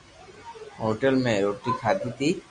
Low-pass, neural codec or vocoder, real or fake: 9.9 kHz; none; real